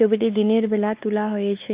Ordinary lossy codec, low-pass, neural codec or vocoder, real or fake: Opus, 32 kbps; 3.6 kHz; codec, 16 kHz, 4 kbps, X-Codec, WavLM features, trained on Multilingual LibriSpeech; fake